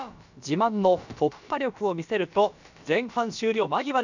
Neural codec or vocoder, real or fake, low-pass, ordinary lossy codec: codec, 16 kHz, about 1 kbps, DyCAST, with the encoder's durations; fake; 7.2 kHz; none